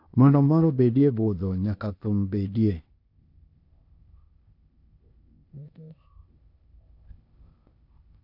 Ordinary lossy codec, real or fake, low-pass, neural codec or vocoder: none; fake; 5.4 kHz; codec, 16 kHz, 0.8 kbps, ZipCodec